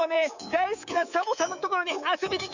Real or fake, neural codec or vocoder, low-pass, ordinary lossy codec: fake; codec, 24 kHz, 3.1 kbps, DualCodec; 7.2 kHz; none